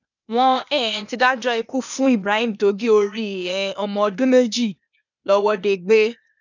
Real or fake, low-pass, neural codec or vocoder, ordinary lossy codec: fake; 7.2 kHz; codec, 16 kHz, 0.8 kbps, ZipCodec; none